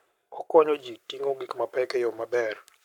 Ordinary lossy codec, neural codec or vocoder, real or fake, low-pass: none; codec, 44.1 kHz, 7.8 kbps, Pupu-Codec; fake; 19.8 kHz